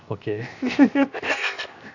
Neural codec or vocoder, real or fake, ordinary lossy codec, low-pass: codec, 16 kHz, 0.7 kbps, FocalCodec; fake; none; 7.2 kHz